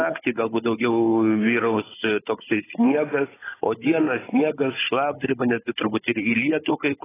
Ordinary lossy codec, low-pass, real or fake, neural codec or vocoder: AAC, 16 kbps; 3.6 kHz; fake; codec, 16 kHz, 16 kbps, FunCodec, trained on Chinese and English, 50 frames a second